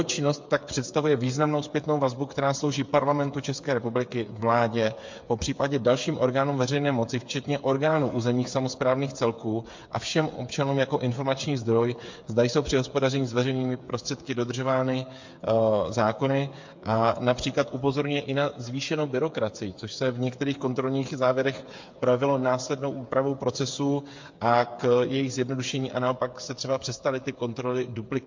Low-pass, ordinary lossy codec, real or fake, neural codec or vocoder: 7.2 kHz; MP3, 48 kbps; fake; codec, 16 kHz, 8 kbps, FreqCodec, smaller model